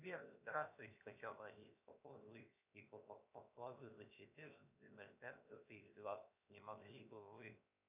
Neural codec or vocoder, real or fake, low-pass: codec, 16 kHz, 0.7 kbps, FocalCodec; fake; 3.6 kHz